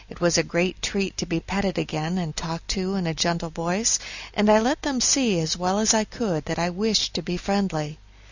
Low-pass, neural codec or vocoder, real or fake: 7.2 kHz; none; real